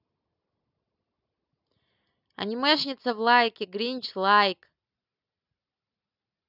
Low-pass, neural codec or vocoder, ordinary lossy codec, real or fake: 5.4 kHz; none; none; real